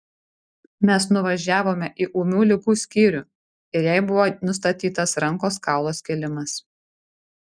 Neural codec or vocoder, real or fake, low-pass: none; real; 9.9 kHz